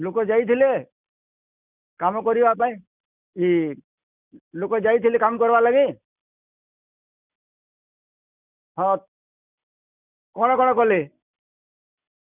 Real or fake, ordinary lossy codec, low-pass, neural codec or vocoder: real; none; 3.6 kHz; none